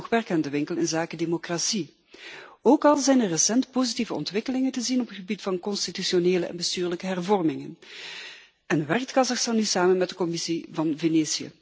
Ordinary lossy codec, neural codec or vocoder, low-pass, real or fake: none; none; none; real